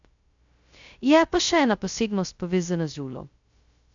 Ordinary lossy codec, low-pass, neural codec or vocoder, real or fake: MP3, 64 kbps; 7.2 kHz; codec, 16 kHz, 0.2 kbps, FocalCodec; fake